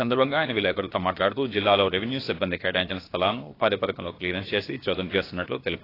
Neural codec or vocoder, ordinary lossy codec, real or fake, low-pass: codec, 16 kHz, about 1 kbps, DyCAST, with the encoder's durations; AAC, 24 kbps; fake; 5.4 kHz